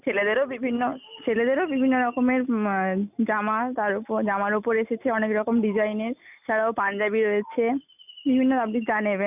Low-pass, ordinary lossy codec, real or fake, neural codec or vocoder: 3.6 kHz; none; real; none